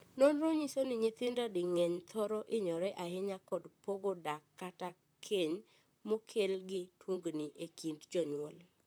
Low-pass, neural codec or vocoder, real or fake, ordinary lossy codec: none; vocoder, 44.1 kHz, 128 mel bands, Pupu-Vocoder; fake; none